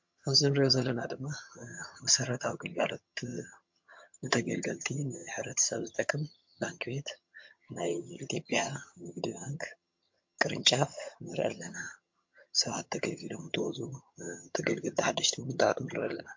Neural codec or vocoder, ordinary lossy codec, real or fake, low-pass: vocoder, 22.05 kHz, 80 mel bands, HiFi-GAN; MP3, 48 kbps; fake; 7.2 kHz